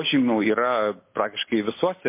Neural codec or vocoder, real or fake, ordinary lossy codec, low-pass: none; real; MP3, 24 kbps; 3.6 kHz